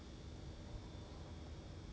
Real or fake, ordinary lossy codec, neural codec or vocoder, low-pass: real; none; none; none